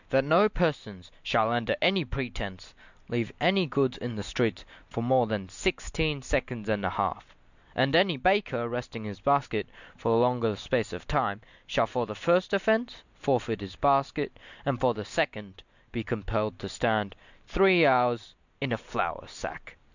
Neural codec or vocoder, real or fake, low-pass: none; real; 7.2 kHz